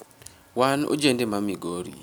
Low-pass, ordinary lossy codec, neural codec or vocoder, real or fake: none; none; none; real